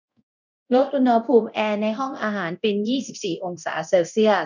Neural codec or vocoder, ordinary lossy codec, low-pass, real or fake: codec, 24 kHz, 0.9 kbps, DualCodec; none; 7.2 kHz; fake